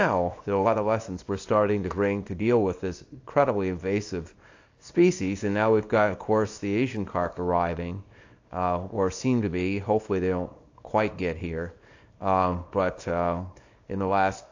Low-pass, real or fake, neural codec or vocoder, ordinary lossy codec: 7.2 kHz; fake; codec, 24 kHz, 0.9 kbps, WavTokenizer, small release; AAC, 48 kbps